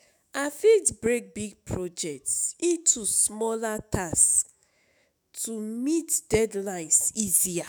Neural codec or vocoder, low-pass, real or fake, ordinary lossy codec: autoencoder, 48 kHz, 128 numbers a frame, DAC-VAE, trained on Japanese speech; none; fake; none